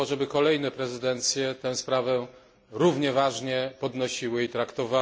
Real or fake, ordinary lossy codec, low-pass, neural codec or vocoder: real; none; none; none